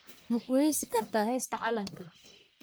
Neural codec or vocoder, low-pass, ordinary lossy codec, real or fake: codec, 44.1 kHz, 1.7 kbps, Pupu-Codec; none; none; fake